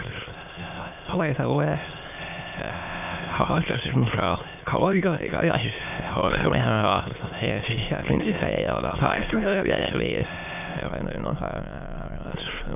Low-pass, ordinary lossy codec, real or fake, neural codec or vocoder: 3.6 kHz; none; fake; autoencoder, 22.05 kHz, a latent of 192 numbers a frame, VITS, trained on many speakers